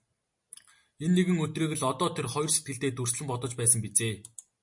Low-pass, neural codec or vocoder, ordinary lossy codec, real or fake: 10.8 kHz; none; MP3, 48 kbps; real